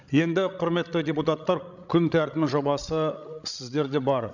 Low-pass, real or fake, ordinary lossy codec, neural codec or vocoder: 7.2 kHz; fake; none; codec, 16 kHz, 16 kbps, FreqCodec, larger model